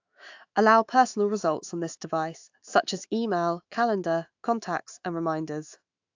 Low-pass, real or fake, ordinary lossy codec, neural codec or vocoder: 7.2 kHz; fake; AAC, 48 kbps; autoencoder, 48 kHz, 128 numbers a frame, DAC-VAE, trained on Japanese speech